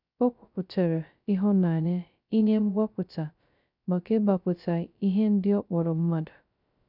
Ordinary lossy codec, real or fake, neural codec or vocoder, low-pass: none; fake; codec, 16 kHz, 0.2 kbps, FocalCodec; 5.4 kHz